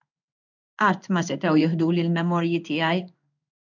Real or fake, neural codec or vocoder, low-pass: fake; codec, 16 kHz in and 24 kHz out, 1 kbps, XY-Tokenizer; 7.2 kHz